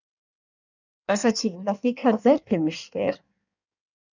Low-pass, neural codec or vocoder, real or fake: 7.2 kHz; codec, 16 kHz in and 24 kHz out, 1.1 kbps, FireRedTTS-2 codec; fake